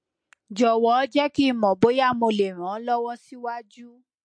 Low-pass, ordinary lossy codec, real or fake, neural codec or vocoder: 9.9 kHz; MP3, 48 kbps; real; none